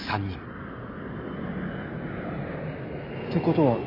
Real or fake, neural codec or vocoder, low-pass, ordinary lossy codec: real; none; 5.4 kHz; AAC, 32 kbps